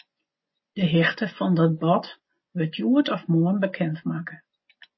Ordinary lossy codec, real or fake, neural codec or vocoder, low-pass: MP3, 24 kbps; fake; vocoder, 24 kHz, 100 mel bands, Vocos; 7.2 kHz